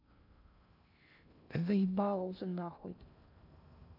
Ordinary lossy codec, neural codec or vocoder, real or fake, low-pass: none; codec, 16 kHz in and 24 kHz out, 0.6 kbps, FocalCodec, streaming, 2048 codes; fake; 5.4 kHz